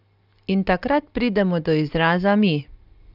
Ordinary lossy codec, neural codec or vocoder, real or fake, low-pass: Opus, 32 kbps; none; real; 5.4 kHz